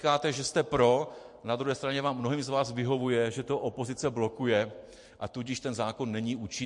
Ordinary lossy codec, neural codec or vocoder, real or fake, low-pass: MP3, 48 kbps; vocoder, 44.1 kHz, 128 mel bands every 256 samples, BigVGAN v2; fake; 10.8 kHz